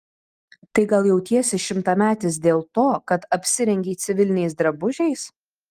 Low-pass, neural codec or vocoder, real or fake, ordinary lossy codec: 14.4 kHz; none; real; Opus, 24 kbps